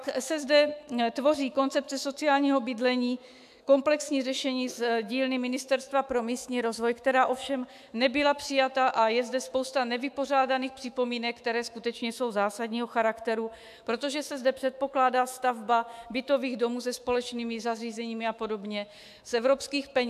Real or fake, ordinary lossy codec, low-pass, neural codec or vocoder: fake; AAC, 96 kbps; 14.4 kHz; autoencoder, 48 kHz, 128 numbers a frame, DAC-VAE, trained on Japanese speech